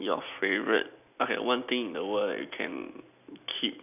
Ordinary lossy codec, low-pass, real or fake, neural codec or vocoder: none; 3.6 kHz; real; none